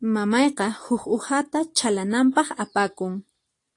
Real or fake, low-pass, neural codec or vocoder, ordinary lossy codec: real; 10.8 kHz; none; AAC, 48 kbps